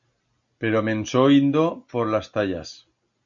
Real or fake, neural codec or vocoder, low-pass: real; none; 7.2 kHz